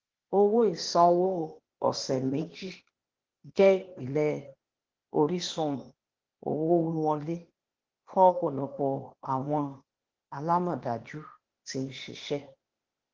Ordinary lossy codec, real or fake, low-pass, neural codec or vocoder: Opus, 16 kbps; fake; 7.2 kHz; codec, 16 kHz, 0.8 kbps, ZipCodec